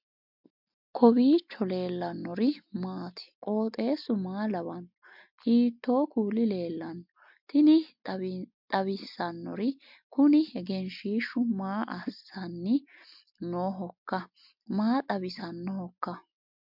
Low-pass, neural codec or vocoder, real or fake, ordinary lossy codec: 5.4 kHz; none; real; MP3, 48 kbps